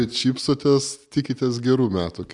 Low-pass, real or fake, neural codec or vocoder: 10.8 kHz; real; none